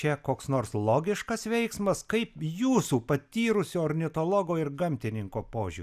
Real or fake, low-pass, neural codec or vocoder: real; 14.4 kHz; none